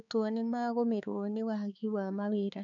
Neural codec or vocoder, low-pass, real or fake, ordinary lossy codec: codec, 16 kHz, 4 kbps, X-Codec, HuBERT features, trained on balanced general audio; 7.2 kHz; fake; none